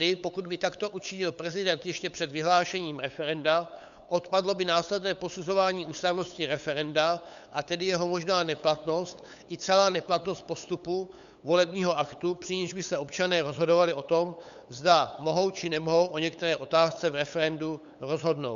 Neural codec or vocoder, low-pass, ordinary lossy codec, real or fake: codec, 16 kHz, 8 kbps, FunCodec, trained on LibriTTS, 25 frames a second; 7.2 kHz; AAC, 96 kbps; fake